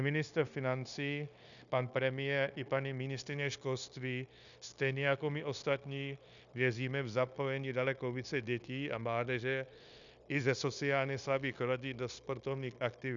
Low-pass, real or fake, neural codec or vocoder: 7.2 kHz; fake; codec, 16 kHz, 0.9 kbps, LongCat-Audio-Codec